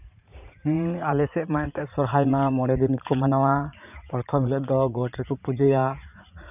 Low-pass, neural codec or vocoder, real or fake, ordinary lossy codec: 3.6 kHz; vocoder, 44.1 kHz, 80 mel bands, Vocos; fake; none